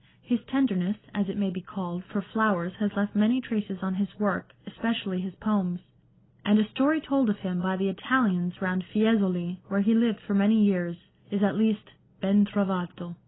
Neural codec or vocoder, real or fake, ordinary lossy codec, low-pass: none; real; AAC, 16 kbps; 7.2 kHz